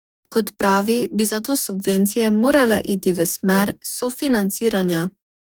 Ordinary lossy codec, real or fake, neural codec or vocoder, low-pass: none; fake; codec, 44.1 kHz, 2.6 kbps, DAC; none